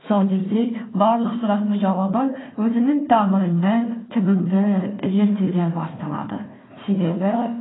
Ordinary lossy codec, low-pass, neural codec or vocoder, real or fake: AAC, 16 kbps; 7.2 kHz; codec, 16 kHz, 1 kbps, FunCodec, trained on Chinese and English, 50 frames a second; fake